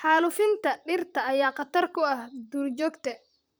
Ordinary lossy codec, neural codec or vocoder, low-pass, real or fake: none; vocoder, 44.1 kHz, 128 mel bands, Pupu-Vocoder; none; fake